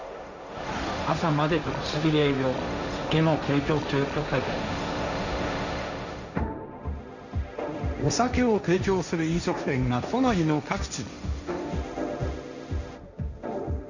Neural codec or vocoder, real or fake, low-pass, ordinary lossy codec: codec, 16 kHz, 1.1 kbps, Voila-Tokenizer; fake; 7.2 kHz; none